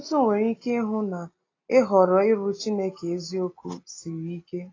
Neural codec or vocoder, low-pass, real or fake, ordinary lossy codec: none; 7.2 kHz; real; AAC, 32 kbps